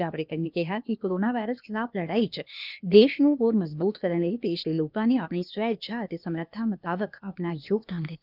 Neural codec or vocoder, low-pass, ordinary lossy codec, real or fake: codec, 16 kHz, 0.8 kbps, ZipCodec; 5.4 kHz; none; fake